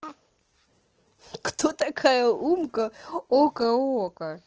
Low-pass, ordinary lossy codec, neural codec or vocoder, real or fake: 7.2 kHz; Opus, 16 kbps; none; real